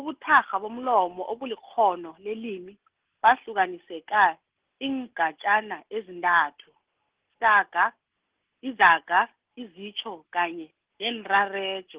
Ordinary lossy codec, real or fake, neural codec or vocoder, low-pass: Opus, 16 kbps; real; none; 3.6 kHz